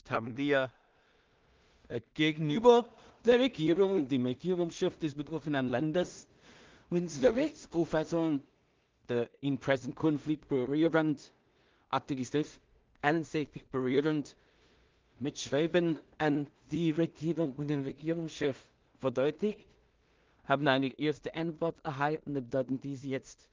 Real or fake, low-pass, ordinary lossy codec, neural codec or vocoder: fake; 7.2 kHz; Opus, 32 kbps; codec, 16 kHz in and 24 kHz out, 0.4 kbps, LongCat-Audio-Codec, two codebook decoder